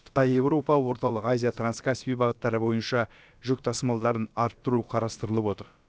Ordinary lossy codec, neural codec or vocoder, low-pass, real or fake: none; codec, 16 kHz, about 1 kbps, DyCAST, with the encoder's durations; none; fake